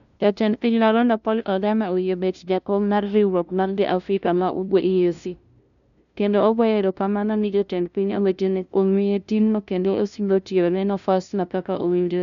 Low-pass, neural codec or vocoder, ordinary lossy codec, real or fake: 7.2 kHz; codec, 16 kHz, 0.5 kbps, FunCodec, trained on LibriTTS, 25 frames a second; none; fake